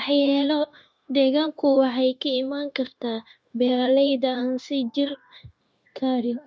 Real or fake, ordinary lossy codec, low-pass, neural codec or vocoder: fake; none; none; codec, 16 kHz, 0.9 kbps, LongCat-Audio-Codec